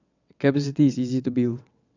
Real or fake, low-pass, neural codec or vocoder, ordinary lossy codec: fake; 7.2 kHz; vocoder, 22.05 kHz, 80 mel bands, WaveNeXt; none